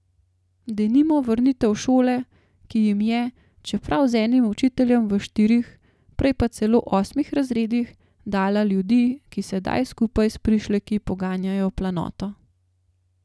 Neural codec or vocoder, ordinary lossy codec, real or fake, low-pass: none; none; real; none